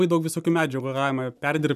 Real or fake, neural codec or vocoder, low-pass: real; none; 14.4 kHz